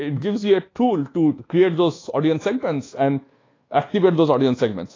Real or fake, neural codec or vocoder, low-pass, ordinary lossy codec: fake; codec, 24 kHz, 1.2 kbps, DualCodec; 7.2 kHz; AAC, 32 kbps